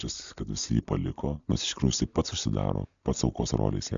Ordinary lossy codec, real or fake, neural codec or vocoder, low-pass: MP3, 64 kbps; real; none; 7.2 kHz